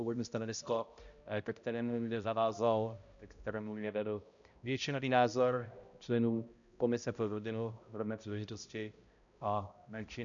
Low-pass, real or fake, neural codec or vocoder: 7.2 kHz; fake; codec, 16 kHz, 0.5 kbps, X-Codec, HuBERT features, trained on balanced general audio